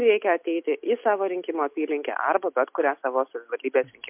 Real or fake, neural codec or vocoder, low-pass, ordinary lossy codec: real; none; 3.6 kHz; MP3, 32 kbps